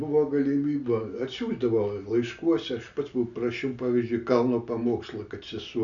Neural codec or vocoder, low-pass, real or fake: none; 7.2 kHz; real